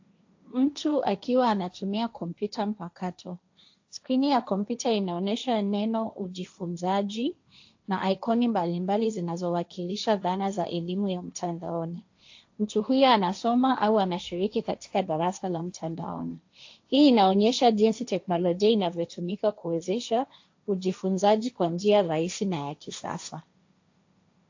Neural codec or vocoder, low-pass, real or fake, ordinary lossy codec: codec, 16 kHz, 1.1 kbps, Voila-Tokenizer; 7.2 kHz; fake; AAC, 48 kbps